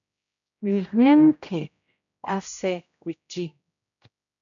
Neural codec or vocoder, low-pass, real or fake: codec, 16 kHz, 0.5 kbps, X-Codec, HuBERT features, trained on general audio; 7.2 kHz; fake